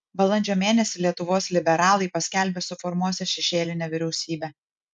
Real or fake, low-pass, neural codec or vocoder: real; 10.8 kHz; none